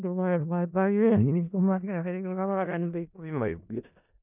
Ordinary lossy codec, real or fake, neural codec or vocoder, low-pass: none; fake; codec, 16 kHz in and 24 kHz out, 0.4 kbps, LongCat-Audio-Codec, four codebook decoder; 3.6 kHz